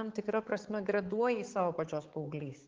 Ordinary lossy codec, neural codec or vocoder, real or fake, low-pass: Opus, 16 kbps; codec, 16 kHz, 4 kbps, X-Codec, HuBERT features, trained on balanced general audio; fake; 7.2 kHz